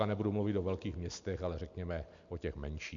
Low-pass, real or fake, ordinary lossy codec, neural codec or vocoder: 7.2 kHz; real; MP3, 64 kbps; none